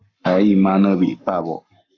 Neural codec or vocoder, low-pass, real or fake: codec, 44.1 kHz, 7.8 kbps, Pupu-Codec; 7.2 kHz; fake